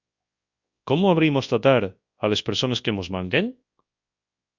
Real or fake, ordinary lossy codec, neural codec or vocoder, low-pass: fake; Opus, 64 kbps; codec, 24 kHz, 0.9 kbps, WavTokenizer, large speech release; 7.2 kHz